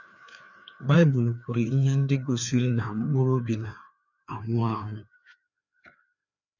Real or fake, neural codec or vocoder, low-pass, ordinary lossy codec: fake; codec, 16 kHz, 2 kbps, FreqCodec, larger model; 7.2 kHz; none